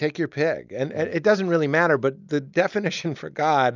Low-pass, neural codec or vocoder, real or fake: 7.2 kHz; none; real